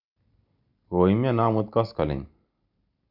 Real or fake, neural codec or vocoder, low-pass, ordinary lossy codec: real; none; 5.4 kHz; none